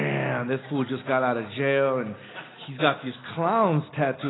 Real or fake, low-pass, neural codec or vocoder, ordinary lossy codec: real; 7.2 kHz; none; AAC, 16 kbps